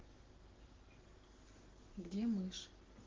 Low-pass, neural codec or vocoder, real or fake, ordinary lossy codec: 7.2 kHz; none; real; Opus, 16 kbps